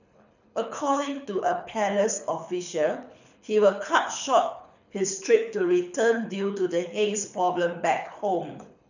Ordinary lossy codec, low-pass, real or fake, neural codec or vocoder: none; 7.2 kHz; fake; codec, 24 kHz, 6 kbps, HILCodec